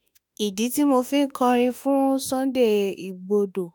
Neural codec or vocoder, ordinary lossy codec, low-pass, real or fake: autoencoder, 48 kHz, 32 numbers a frame, DAC-VAE, trained on Japanese speech; none; none; fake